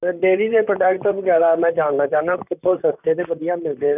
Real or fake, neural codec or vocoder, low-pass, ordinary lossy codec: fake; vocoder, 44.1 kHz, 128 mel bands, Pupu-Vocoder; 3.6 kHz; none